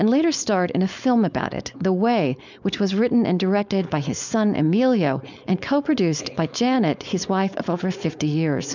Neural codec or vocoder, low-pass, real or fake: codec, 16 kHz, 4.8 kbps, FACodec; 7.2 kHz; fake